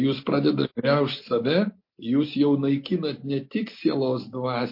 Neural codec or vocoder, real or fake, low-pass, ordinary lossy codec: none; real; 5.4 kHz; MP3, 32 kbps